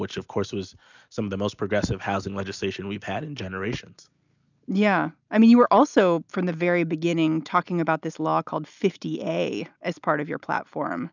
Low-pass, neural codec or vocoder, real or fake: 7.2 kHz; none; real